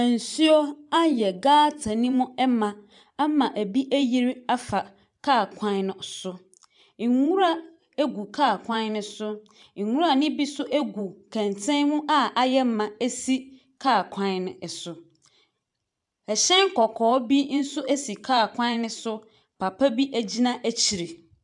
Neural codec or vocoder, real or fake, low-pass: vocoder, 44.1 kHz, 128 mel bands every 512 samples, BigVGAN v2; fake; 10.8 kHz